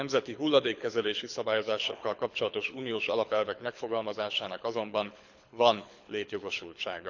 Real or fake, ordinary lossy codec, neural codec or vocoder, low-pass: fake; none; codec, 24 kHz, 6 kbps, HILCodec; 7.2 kHz